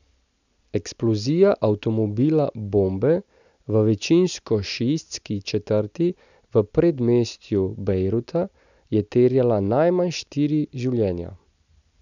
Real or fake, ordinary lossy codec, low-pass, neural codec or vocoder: real; none; 7.2 kHz; none